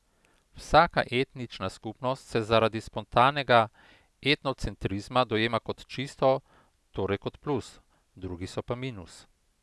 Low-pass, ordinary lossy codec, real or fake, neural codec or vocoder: none; none; real; none